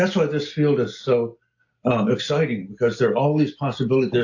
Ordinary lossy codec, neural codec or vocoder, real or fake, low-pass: AAC, 48 kbps; none; real; 7.2 kHz